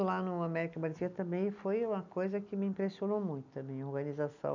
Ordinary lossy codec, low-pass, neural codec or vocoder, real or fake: none; 7.2 kHz; none; real